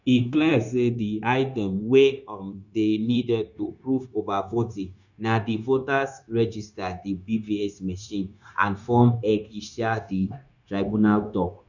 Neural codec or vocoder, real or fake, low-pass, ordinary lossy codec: codec, 16 kHz, 0.9 kbps, LongCat-Audio-Codec; fake; 7.2 kHz; none